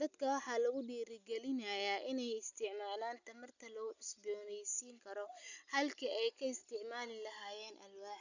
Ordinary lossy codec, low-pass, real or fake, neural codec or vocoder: none; 7.2 kHz; real; none